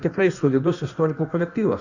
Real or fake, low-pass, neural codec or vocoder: fake; 7.2 kHz; codec, 16 kHz in and 24 kHz out, 1.1 kbps, FireRedTTS-2 codec